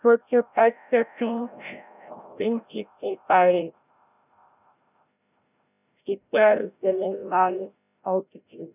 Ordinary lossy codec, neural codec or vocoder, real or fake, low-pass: none; codec, 16 kHz, 0.5 kbps, FreqCodec, larger model; fake; 3.6 kHz